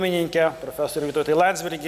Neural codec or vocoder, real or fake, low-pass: none; real; 14.4 kHz